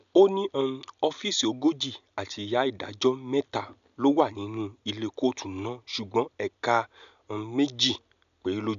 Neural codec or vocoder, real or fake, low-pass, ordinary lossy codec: none; real; 7.2 kHz; none